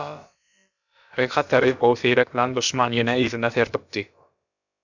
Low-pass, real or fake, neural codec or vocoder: 7.2 kHz; fake; codec, 16 kHz, about 1 kbps, DyCAST, with the encoder's durations